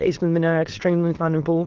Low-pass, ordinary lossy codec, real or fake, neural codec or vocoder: 7.2 kHz; Opus, 16 kbps; fake; autoencoder, 22.05 kHz, a latent of 192 numbers a frame, VITS, trained on many speakers